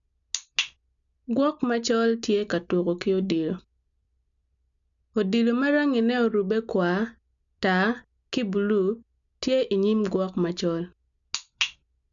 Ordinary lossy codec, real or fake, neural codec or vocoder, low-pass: none; real; none; 7.2 kHz